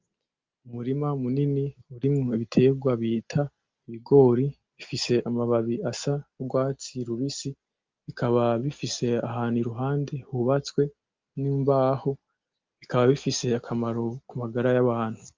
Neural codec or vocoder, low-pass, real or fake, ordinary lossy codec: none; 7.2 kHz; real; Opus, 24 kbps